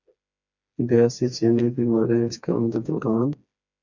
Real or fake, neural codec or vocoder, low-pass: fake; codec, 16 kHz, 2 kbps, FreqCodec, smaller model; 7.2 kHz